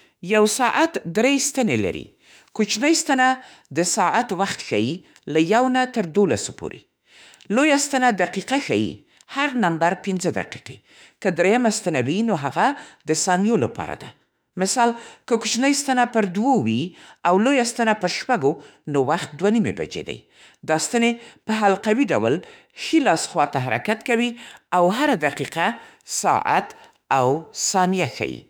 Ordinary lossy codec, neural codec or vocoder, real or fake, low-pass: none; autoencoder, 48 kHz, 32 numbers a frame, DAC-VAE, trained on Japanese speech; fake; none